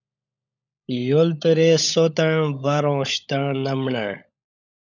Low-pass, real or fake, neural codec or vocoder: 7.2 kHz; fake; codec, 16 kHz, 16 kbps, FunCodec, trained on LibriTTS, 50 frames a second